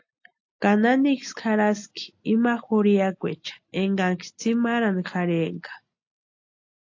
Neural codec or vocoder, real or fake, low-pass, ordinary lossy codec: none; real; 7.2 kHz; AAC, 48 kbps